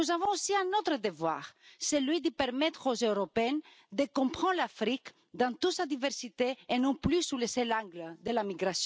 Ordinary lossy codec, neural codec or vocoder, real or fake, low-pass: none; none; real; none